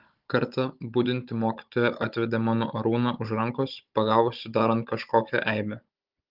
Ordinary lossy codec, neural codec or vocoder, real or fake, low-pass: Opus, 32 kbps; codec, 16 kHz, 16 kbps, FunCodec, trained on Chinese and English, 50 frames a second; fake; 5.4 kHz